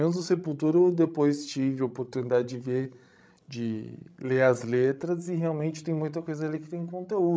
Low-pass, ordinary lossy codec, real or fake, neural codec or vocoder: none; none; fake; codec, 16 kHz, 16 kbps, FreqCodec, larger model